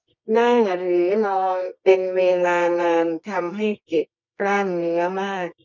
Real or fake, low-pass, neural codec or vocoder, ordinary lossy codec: fake; 7.2 kHz; codec, 24 kHz, 0.9 kbps, WavTokenizer, medium music audio release; none